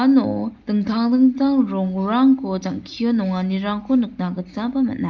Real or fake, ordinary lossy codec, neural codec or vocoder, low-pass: real; Opus, 24 kbps; none; 7.2 kHz